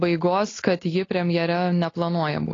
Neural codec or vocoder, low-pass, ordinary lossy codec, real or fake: none; 7.2 kHz; AAC, 32 kbps; real